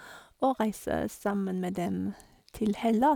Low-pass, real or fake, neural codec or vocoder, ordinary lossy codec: 19.8 kHz; real; none; none